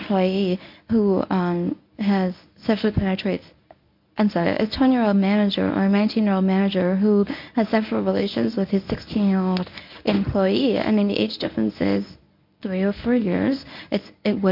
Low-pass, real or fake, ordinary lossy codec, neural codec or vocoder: 5.4 kHz; fake; MP3, 48 kbps; codec, 24 kHz, 0.9 kbps, WavTokenizer, medium speech release version 1